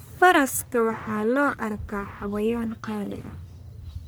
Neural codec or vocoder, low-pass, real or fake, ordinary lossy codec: codec, 44.1 kHz, 1.7 kbps, Pupu-Codec; none; fake; none